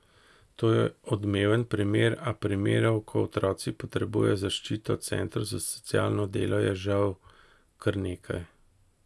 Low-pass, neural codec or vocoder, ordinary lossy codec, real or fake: none; none; none; real